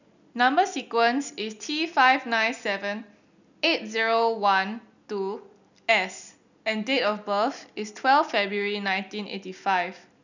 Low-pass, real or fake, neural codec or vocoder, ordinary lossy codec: 7.2 kHz; real; none; none